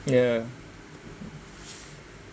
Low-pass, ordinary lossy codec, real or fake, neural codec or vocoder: none; none; real; none